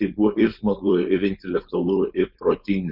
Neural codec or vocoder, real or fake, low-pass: codec, 16 kHz, 4.8 kbps, FACodec; fake; 5.4 kHz